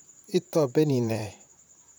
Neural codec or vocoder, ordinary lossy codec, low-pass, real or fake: vocoder, 44.1 kHz, 128 mel bands, Pupu-Vocoder; none; none; fake